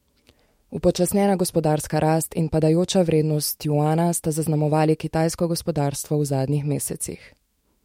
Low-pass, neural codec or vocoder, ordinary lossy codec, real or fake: 19.8 kHz; autoencoder, 48 kHz, 128 numbers a frame, DAC-VAE, trained on Japanese speech; MP3, 64 kbps; fake